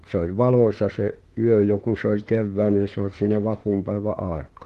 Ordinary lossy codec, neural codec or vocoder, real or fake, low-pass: Opus, 16 kbps; autoencoder, 48 kHz, 32 numbers a frame, DAC-VAE, trained on Japanese speech; fake; 14.4 kHz